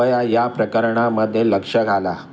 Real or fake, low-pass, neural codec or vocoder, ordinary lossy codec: real; none; none; none